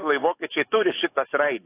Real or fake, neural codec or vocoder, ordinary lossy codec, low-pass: fake; autoencoder, 48 kHz, 128 numbers a frame, DAC-VAE, trained on Japanese speech; AAC, 24 kbps; 3.6 kHz